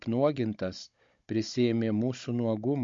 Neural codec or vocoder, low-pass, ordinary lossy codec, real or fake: codec, 16 kHz, 16 kbps, FunCodec, trained on Chinese and English, 50 frames a second; 7.2 kHz; MP3, 48 kbps; fake